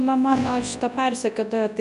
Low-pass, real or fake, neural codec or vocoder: 10.8 kHz; fake; codec, 24 kHz, 0.9 kbps, WavTokenizer, large speech release